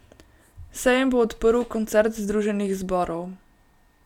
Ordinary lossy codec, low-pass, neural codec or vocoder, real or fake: none; 19.8 kHz; none; real